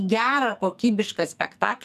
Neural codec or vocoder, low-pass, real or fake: codec, 44.1 kHz, 2.6 kbps, SNAC; 14.4 kHz; fake